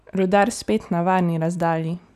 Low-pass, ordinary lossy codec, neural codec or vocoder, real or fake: 14.4 kHz; none; none; real